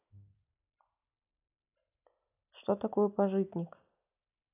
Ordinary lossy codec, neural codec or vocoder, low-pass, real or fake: none; none; 3.6 kHz; real